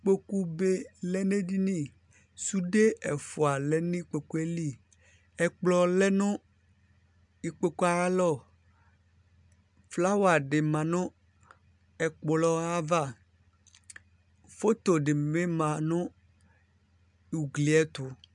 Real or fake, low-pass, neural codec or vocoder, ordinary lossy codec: real; 10.8 kHz; none; MP3, 96 kbps